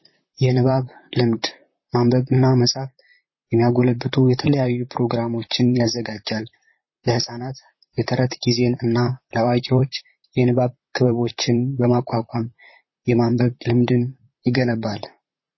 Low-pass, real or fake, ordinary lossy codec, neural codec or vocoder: 7.2 kHz; fake; MP3, 24 kbps; vocoder, 44.1 kHz, 128 mel bands, Pupu-Vocoder